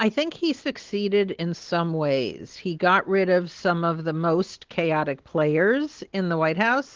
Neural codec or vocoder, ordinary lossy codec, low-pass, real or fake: none; Opus, 16 kbps; 7.2 kHz; real